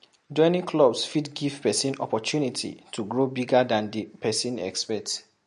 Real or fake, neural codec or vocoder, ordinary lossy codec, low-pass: real; none; MP3, 48 kbps; 14.4 kHz